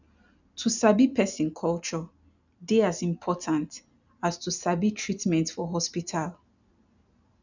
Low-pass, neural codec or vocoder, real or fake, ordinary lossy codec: 7.2 kHz; none; real; none